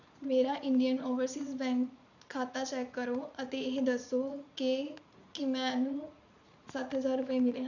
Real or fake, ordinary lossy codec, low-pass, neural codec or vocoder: fake; none; 7.2 kHz; vocoder, 44.1 kHz, 80 mel bands, Vocos